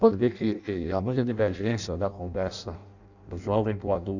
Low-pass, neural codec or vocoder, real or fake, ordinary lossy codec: 7.2 kHz; codec, 16 kHz in and 24 kHz out, 0.6 kbps, FireRedTTS-2 codec; fake; none